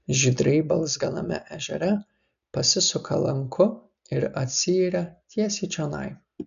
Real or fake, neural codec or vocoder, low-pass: real; none; 7.2 kHz